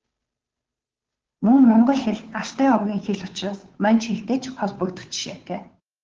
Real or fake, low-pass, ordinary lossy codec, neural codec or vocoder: fake; 7.2 kHz; Opus, 16 kbps; codec, 16 kHz, 2 kbps, FunCodec, trained on Chinese and English, 25 frames a second